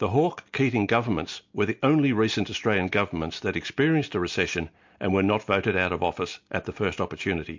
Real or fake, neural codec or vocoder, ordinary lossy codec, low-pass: real; none; MP3, 48 kbps; 7.2 kHz